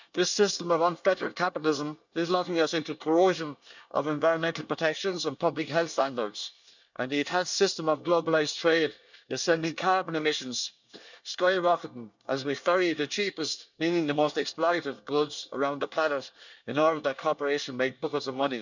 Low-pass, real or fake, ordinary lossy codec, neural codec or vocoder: 7.2 kHz; fake; none; codec, 24 kHz, 1 kbps, SNAC